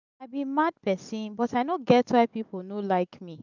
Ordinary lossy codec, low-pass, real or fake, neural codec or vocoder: none; 7.2 kHz; real; none